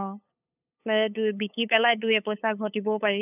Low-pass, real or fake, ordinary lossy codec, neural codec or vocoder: 3.6 kHz; fake; none; codec, 16 kHz, 8 kbps, FunCodec, trained on LibriTTS, 25 frames a second